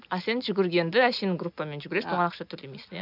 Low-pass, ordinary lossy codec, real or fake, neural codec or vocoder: 5.4 kHz; none; real; none